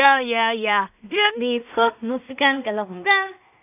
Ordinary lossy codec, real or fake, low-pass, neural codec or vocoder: none; fake; 3.6 kHz; codec, 16 kHz in and 24 kHz out, 0.4 kbps, LongCat-Audio-Codec, two codebook decoder